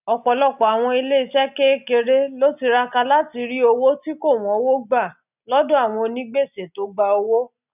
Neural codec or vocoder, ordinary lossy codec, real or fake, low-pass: none; none; real; 3.6 kHz